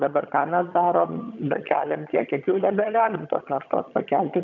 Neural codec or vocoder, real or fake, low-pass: vocoder, 22.05 kHz, 80 mel bands, HiFi-GAN; fake; 7.2 kHz